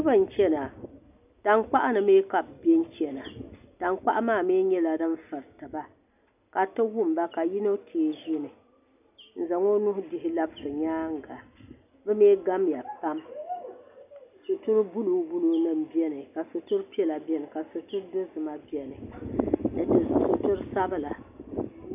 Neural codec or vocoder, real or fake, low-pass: none; real; 3.6 kHz